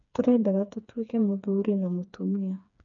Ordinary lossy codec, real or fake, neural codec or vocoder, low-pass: none; fake; codec, 16 kHz, 4 kbps, FreqCodec, smaller model; 7.2 kHz